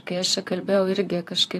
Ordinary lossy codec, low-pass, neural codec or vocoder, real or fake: AAC, 64 kbps; 14.4 kHz; vocoder, 44.1 kHz, 128 mel bands, Pupu-Vocoder; fake